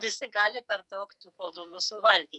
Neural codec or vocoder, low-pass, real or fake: codec, 44.1 kHz, 2.6 kbps, SNAC; 10.8 kHz; fake